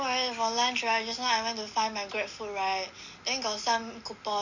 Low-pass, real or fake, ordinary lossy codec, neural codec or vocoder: 7.2 kHz; real; none; none